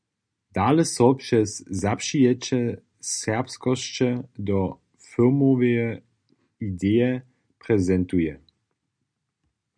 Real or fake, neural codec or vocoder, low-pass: real; none; 9.9 kHz